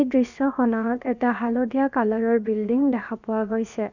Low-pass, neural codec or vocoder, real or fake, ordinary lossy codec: 7.2 kHz; codec, 16 kHz, about 1 kbps, DyCAST, with the encoder's durations; fake; none